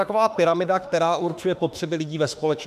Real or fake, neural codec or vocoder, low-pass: fake; autoencoder, 48 kHz, 32 numbers a frame, DAC-VAE, trained on Japanese speech; 14.4 kHz